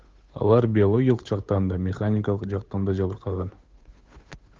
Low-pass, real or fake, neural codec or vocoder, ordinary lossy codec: 7.2 kHz; fake; codec, 16 kHz, 8 kbps, FunCodec, trained on Chinese and English, 25 frames a second; Opus, 32 kbps